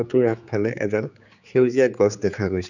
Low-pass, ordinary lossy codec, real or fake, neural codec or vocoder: 7.2 kHz; none; fake; codec, 16 kHz, 4 kbps, X-Codec, HuBERT features, trained on general audio